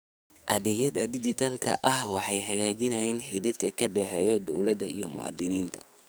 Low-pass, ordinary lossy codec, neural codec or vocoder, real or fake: none; none; codec, 44.1 kHz, 2.6 kbps, SNAC; fake